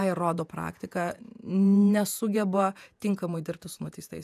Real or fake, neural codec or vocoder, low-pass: fake; vocoder, 48 kHz, 128 mel bands, Vocos; 14.4 kHz